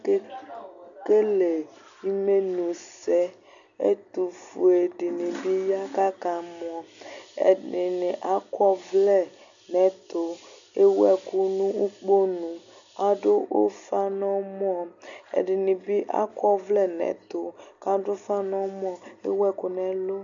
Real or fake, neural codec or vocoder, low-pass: real; none; 7.2 kHz